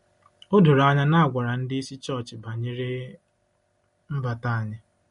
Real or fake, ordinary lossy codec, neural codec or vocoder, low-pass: real; MP3, 48 kbps; none; 10.8 kHz